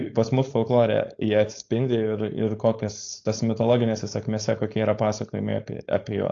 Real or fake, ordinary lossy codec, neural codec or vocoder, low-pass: fake; AAC, 48 kbps; codec, 16 kHz, 4.8 kbps, FACodec; 7.2 kHz